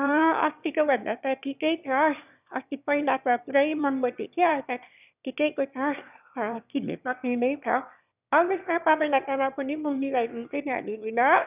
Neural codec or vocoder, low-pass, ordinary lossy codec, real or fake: autoencoder, 22.05 kHz, a latent of 192 numbers a frame, VITS, trained on one speaker; 3.6 kHz; none; fake